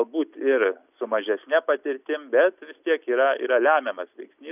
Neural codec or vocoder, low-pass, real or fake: none; 3.6 kHz; real